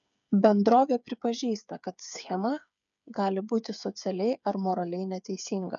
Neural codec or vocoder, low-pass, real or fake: codec, 16 kHz, 8 kbps, FreqCodec, smaller model; 7.2 kHz; fake